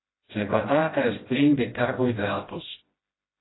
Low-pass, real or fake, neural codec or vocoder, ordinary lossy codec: 7.2 kHz; fake; codec, 16 kHz, 0.5 kbps, FreqCodec, smaller model; AAC, 16 kbps